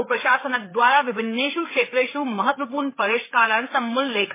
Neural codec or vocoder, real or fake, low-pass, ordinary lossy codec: codec, 16 kHz, 4 kbps, FreqCodec, larger model; fake; 3.6 kHz; MP3, 16 kbps